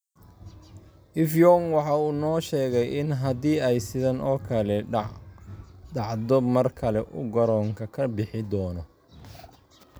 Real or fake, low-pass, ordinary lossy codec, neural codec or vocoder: real; none; none; none